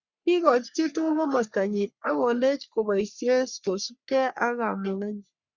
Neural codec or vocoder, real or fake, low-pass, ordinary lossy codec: codec, 44.1 kHz, 3.4 kbps, Pupu-Codec; fake; 7.2 kHz; Opus, 64 kbps